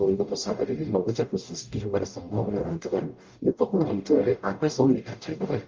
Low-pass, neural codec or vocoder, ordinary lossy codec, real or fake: 7.2 kHz; codec, 44.1 kHz, 0.9 kbps, DAC; Opus, 32 kbps; fake